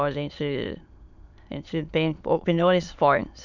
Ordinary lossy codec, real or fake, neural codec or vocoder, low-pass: none; fake; autoencoder, 22.05 kHz, a latent of 192 numbers a frame, VITS, trained on many speakers; 7.2 kHz